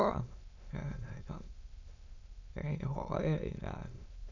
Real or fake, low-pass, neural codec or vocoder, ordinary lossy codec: fake; 7.2 kHz; autoencoder, 22.05 kHz, a latent of 192 numbers a frame, VITS, trained on many speakers; none